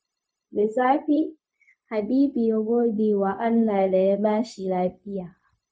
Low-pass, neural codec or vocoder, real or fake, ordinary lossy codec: none; codec, 16 kHz, 0.4 kbps, LongCat-Audio-Codec; fake; none